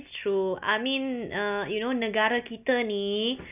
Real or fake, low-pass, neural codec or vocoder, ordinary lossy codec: real; 3.6 kHz; none; none